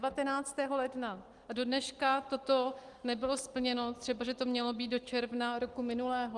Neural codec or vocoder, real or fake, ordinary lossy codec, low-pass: none; real; Opus, 24 kbps; 10.8 kHz